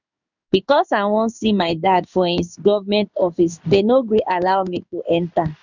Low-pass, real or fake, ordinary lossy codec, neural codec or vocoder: 7.2 kHz; fake; none; codec, 16 kHz in and 24 kHz out, 1 kbps, XY-Tokenizer